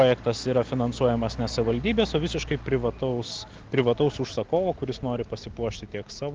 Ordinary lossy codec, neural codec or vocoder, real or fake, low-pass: Opus, 16 kbps; none; real; 7.2 kHz